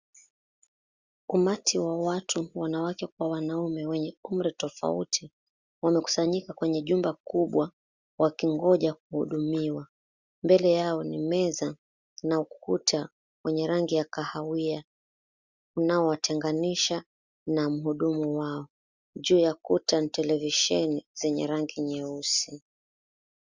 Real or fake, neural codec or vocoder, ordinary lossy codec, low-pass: real; none; Opus, 64 kbps; 7.2 kHz